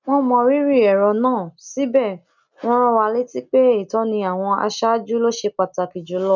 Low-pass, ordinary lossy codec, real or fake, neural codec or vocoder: 7.2 kHz; none; real; none